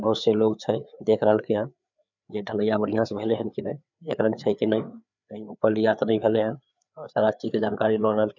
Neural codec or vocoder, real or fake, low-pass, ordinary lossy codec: codec, 16 kHz, 4 kbps, FreqCodec, larger model; fake; 7.2 kHz; none